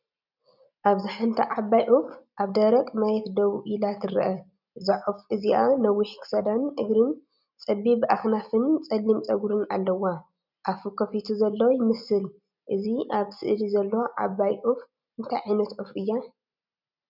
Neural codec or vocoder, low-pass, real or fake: none; 5.4 kHz; real